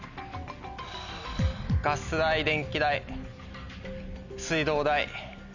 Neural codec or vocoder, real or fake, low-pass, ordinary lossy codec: none; real; 7.2 kHz; none